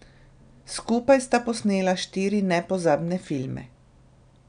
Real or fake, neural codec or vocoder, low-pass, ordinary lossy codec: real; none; 9.9 kHz; none